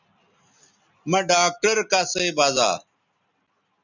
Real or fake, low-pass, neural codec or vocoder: real; 7.2 kHz; none